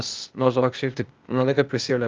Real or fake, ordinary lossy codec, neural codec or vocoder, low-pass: fake; Opus, 24 kbps; codec, 16 kHz, 0.8 kbps, ZipCodec; 7.2 kHz